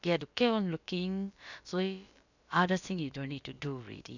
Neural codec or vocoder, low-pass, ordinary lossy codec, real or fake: codec, 16 kHz, about 1 kbps, DyCAST, with the encoder's durations; 7.2 kHz; none; fake